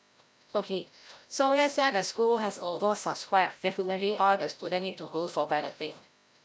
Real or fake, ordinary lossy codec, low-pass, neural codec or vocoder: fake; none; none; codec, 16 kHz, 0.5 kbps, FreqCodec, larger model